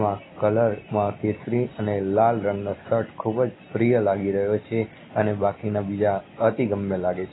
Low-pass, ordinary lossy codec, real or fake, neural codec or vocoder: 7.2 kHz; AAC, 16 kbps; real; none